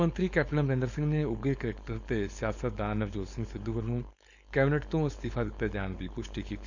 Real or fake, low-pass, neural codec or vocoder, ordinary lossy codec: fake; 7.2 kHz; codec, 16 kHz, 4.8 kbps, FACodec; none